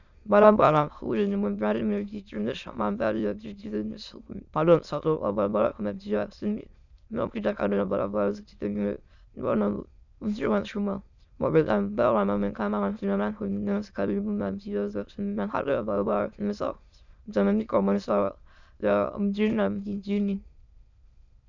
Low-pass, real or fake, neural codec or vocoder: 7.2 kHz; fake; autoencoder, 22.05 kHz, a latent of 192 numbers a frame, VITS, trained on many speakers